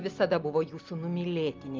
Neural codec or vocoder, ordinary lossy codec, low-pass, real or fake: none; Opus, 32 kbps; 7.2 kHz; real